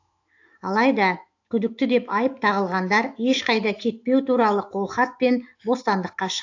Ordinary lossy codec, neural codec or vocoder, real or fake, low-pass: AAC, 48 kbps; autoencoder, 48 kHz, 128 numbers a frame, DAC-VAE, trained on Japanese speech; fake; 7.2 kHz